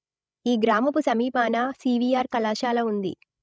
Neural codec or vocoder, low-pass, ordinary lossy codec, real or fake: codec, 16 kHz, 16 kbps, FreqCodec, larger model; none; none; fake